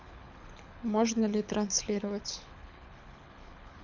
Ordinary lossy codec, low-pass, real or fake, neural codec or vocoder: none; 7.2 kHz; fake; codec, 24 kHz, 6 kbps, HILCodec